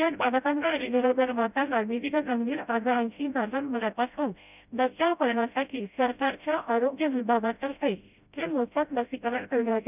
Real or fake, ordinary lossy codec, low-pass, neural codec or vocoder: fake; none; 3.6 kHz; codec, 16 kHz, 0.5 kbps, FreqCodec, smaller model